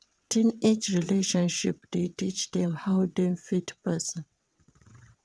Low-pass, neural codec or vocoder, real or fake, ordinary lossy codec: none; vocoder, 22.05 kHz, 80 mel bands, WaveNeXt; fake; none